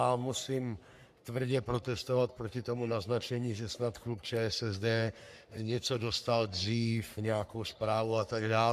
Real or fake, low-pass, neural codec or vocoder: fake; 14.4 kHz; codec, 44.1 kHz, 3.4 kbps, Pupu-Codec